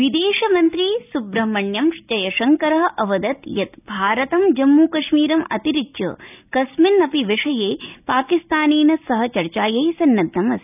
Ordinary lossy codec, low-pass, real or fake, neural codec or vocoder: none; 3.6 kHz; real; none